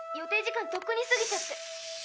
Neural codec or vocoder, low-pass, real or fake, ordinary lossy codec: none; none; real; none